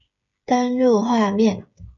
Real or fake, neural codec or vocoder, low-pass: fake; codec, 16 kHz, 8 kbps, FreqCodec, smaller model; 7.2 kHz